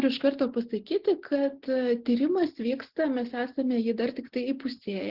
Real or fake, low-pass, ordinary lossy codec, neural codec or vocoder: real; 5.4 kHz; Opus, 16 kbps; none